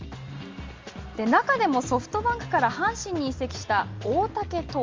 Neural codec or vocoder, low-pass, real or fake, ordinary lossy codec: none; 7.2 kHz; real; Opus, 32 kbps